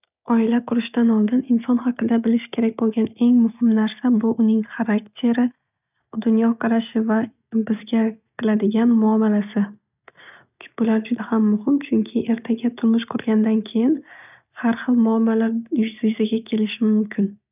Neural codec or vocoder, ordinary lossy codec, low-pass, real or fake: none; none; 3.6 kHz; real